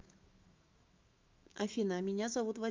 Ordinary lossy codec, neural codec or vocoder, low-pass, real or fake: Opus, 24 kbps; autoencoder, 48 kHz, 128 numbers a frame, DAC-VAE, trained on Japanese speech; 7.2 kHz; fake